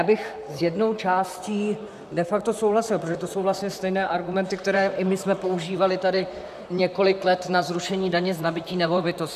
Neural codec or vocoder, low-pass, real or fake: vocoder, 44.1 kHz, 128 mel bands, Pupu-Vocoder; 14.4 kHz; fake